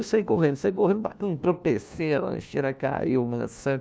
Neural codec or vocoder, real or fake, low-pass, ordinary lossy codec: codec, 16 kHz, 1 kbps, FunCodec, trained on LibriTTS, 50 frames a second; fake; none; none